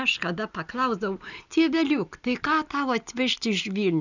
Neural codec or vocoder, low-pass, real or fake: none; 7.2 kHz; real